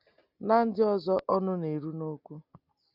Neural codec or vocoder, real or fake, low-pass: none; real; 5.4 kHz